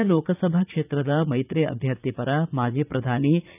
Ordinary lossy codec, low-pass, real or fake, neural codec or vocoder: none; 3.6 kHz; fake; vocoder, 44.1 kHz, 80 mel bands, Vocos